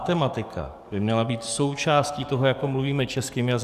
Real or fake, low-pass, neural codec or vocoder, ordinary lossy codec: fake; 14.4 kHz; codec, 44.1 kHz, 7.8 kbps, DAC; Opus, 64 kbps